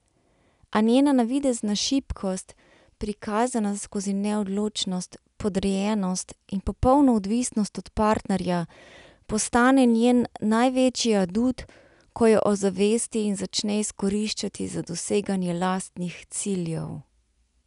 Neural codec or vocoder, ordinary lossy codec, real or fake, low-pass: none; none; real; 10.8 kHz